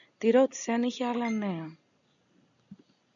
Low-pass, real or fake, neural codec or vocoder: 7.2 kHz; real; none